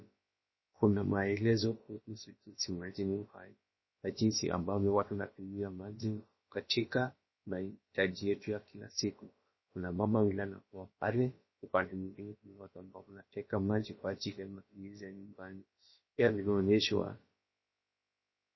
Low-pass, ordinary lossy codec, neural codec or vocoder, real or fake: 7.2 kHz; MP3, 24 kbps; codec, 16 kHz, about 1 kbps, DyCAST, with the encoder's durations; fake